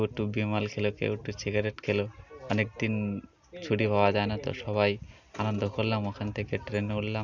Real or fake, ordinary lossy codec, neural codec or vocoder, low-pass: real; none; none; 7.2 kHz